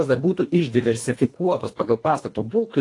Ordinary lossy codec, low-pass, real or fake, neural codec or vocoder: AAC, 48 kbps; 10.8 kHz; fake; codec, 24 kHz, 1.5 kbps, HILCodec